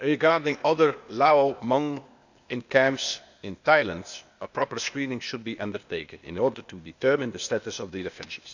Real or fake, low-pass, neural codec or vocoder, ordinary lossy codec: fake; 7.2 kHz; codec, 16 kHz, 0.8 kbps, ZipCodec; none